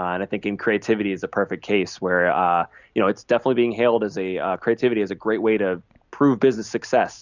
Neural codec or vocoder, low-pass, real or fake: none; 7.2 kHz; real